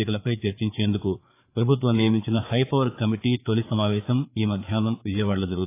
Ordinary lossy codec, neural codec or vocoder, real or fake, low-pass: AAC, 24 kbps; codec, 16 kHz, 4 kbps, FreqCodec, larger model; fake; 3.6 kHz